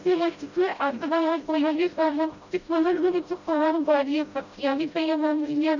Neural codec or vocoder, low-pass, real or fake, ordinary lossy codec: codec, 16 kHz, 0.5 kbps, FreqCodec, smaller model; 7.2 kHz; fake; none